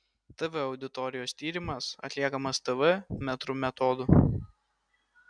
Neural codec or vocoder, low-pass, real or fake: none; 9.9 kHz; real